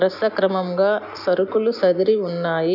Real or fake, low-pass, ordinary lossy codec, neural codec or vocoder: real; 5.4 kHz; none; none